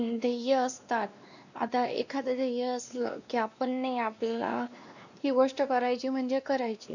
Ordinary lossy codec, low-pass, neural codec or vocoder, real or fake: none; 7.2 kHz; codec, 16 kHz, 2 kbps, X-Codec, WavLM features, trained on Multilingual LibriSpeech; fake